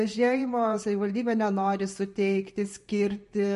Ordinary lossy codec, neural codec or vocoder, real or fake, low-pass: MP3, 48 kbps; vocoder, 44.1 kHz, 128 mel bands every 512 samples, BigVGAN v2; fake; 14.4 kHz